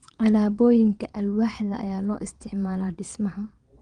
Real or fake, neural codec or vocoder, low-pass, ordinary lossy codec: fake; vocoder, 22.05 kHz, 80 mel bands, WaveNeXt; 9.9 kHz; Opus, 24 kbps